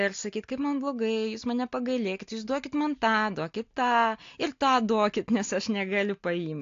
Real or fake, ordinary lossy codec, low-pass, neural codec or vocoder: real; AAC, 48 kbps; 7.2 kHz; none